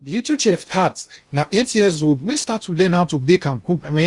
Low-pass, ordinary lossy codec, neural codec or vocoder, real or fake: 10.8 kHz; Opus, 64 kbps; codec, 16 kHz in and 24 kHz out, 0.6 kbps, FocalCodec, streaming, 2048 codes; fake